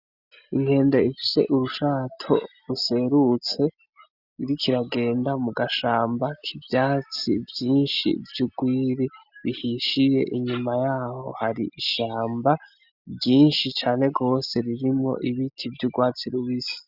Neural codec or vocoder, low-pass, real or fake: none; 5.4 kHz; real